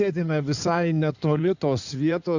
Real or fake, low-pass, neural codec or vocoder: fake; 7.2 kHz; codec, 16 kHz in and 24 kHz out, 2.2 kbps, FireRedTTS-2 codec